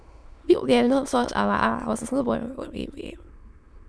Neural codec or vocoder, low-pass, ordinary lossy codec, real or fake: autoencoder, 22.05 kHz, a latent of 192 numbers a frame, VITS, trained on many speakers; none; none; fake